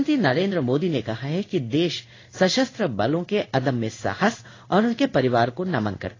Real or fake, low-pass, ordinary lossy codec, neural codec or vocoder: fake; 7.2 kHz; AAC, 32 kbps; codec, 16 kHz in and 24 kHz out, 1 kbps, XY-Tokenizer